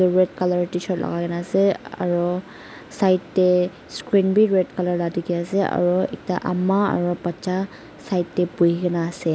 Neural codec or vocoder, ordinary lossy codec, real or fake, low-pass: none; none; real; none